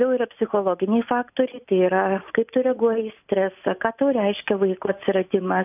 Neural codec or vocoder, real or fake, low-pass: none; real; 3.6 kHz